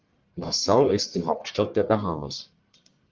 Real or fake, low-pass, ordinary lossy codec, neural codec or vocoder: fake; 7.2 kHz; Opus, 32 kbps; codec, 44.1 kHz, 1.7 kbps, Pupu-Codec